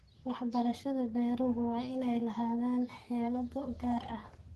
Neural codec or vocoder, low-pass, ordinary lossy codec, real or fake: codec, 44.1 kHz, 3.4 kbps, Pupu-Codec; 14.4 kHz; Opus, 16 kbps; fake